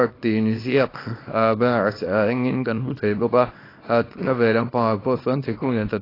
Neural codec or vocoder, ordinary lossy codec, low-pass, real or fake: codec, 24 kHz, 0.9 kbps, WavTokenizer, small release; AAC, 24 kbps; 5.4 kHz; fake